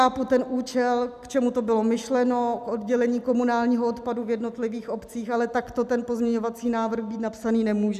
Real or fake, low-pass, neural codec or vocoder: real; 14.4 kHz; none